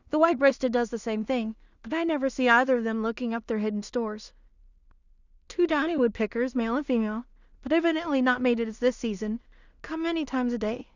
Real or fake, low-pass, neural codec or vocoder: fake; 7.2 kHz; codec, 16 kHz in and 24 kHz out, 0.4 kbps, LongCat-Audio-Codec, two codebook decoder